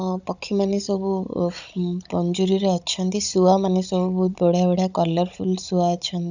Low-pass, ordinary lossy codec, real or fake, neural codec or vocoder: 7.2 kHz; none; fake; codec, 16 kHz, 16 kbps, FunCodec, trained on Chinese and English, 50 frames a second